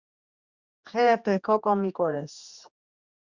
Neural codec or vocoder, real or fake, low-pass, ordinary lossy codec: codec, 16 kHz, 2 kbps, X-Codec, HuBERT features, trained on general audio; fake; 7.2 kHz; Opus, 64 kbps